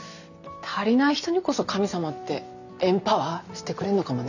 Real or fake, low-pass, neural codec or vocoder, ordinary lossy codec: real; 7.2 kHz; none; none